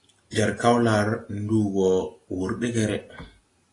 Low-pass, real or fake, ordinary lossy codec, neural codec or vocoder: 10.8 kHz; real; AAC, 32 kbps; none